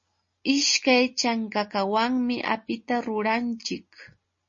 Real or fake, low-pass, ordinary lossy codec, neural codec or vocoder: real; 7.2 kHz; MP3, 32 kbps; none